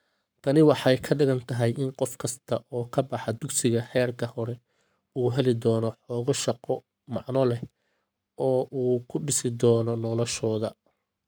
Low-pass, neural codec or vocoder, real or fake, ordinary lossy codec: none; codec, 44.1 kHz, 7.8 kbps, Pupu-Codec; fake; none